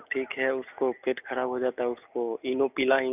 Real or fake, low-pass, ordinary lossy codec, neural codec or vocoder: real; 3.6 kHz; none; none